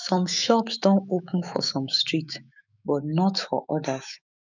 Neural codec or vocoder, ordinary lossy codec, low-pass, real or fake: autoencoder, 48 kHz, 128 numbers a frame, DAC-VAE, trained on Japanese speech; none; 7.2 kHz; fake